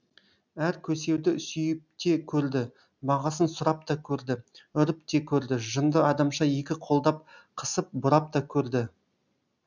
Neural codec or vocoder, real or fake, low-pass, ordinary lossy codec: none; real; 7.2 kHz; none